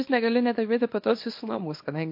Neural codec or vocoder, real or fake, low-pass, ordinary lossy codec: codec, 24 kHz, 0.9 kbps, WavTokenizer, small release; fake; 5.4 kHz; MP3, 32 kbps